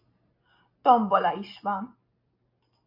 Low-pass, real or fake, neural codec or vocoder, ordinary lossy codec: 5.4 kHz; real; none; AAC, 48 kbps